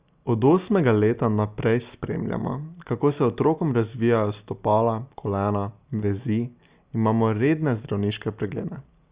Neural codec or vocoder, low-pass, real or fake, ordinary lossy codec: none; 3.6 kHz; real; Opus, 64 kbps